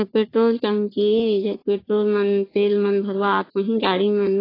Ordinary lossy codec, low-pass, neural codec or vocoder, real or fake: AAC, 24 kbps; 5.4 kHz; codec, 44.1 kHz, 3.4 kbps, Pupu-Codec; fake